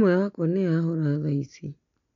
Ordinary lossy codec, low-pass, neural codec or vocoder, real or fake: MP3, 96 kbps; 7.2 kHz; none; real